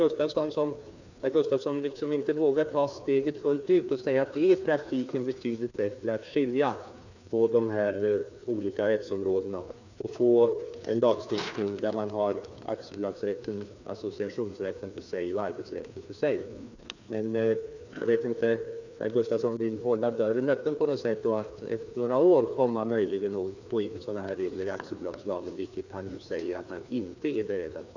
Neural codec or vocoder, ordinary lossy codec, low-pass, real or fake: codec, 16 kHz, 2 kbps, FreqCodec, larger model; none; 7.2 kHz; fake